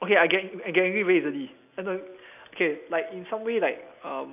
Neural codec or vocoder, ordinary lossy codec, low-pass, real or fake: none; none; 3.6 kHz; real